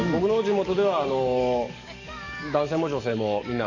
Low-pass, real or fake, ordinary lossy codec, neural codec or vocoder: 7.2 kHz; fake; none; codec, 44.1 kHz, 7.8 kbps, DAC